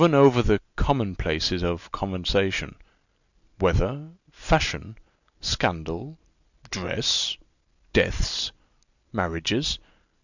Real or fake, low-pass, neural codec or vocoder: real; 7.2 kHz; none